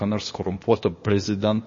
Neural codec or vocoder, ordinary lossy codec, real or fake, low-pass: codec, 16 kHz, 0.8 kbps, ZipCodec; MP3, 32 kbps; fake; 7.2 kHz